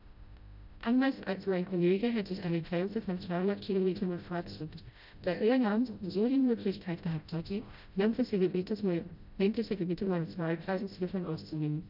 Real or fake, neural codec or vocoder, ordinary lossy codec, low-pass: fake; codec, 16 kHz, 0.5 kbps, FreqCodec, smaller model; none; 5.4 kHz